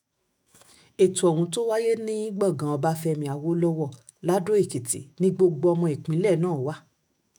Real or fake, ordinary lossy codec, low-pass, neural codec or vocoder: fake; none; none; autoencoder, 48 kHz, 128 numbers a frame, DAC-VAE, trained on Japanese speech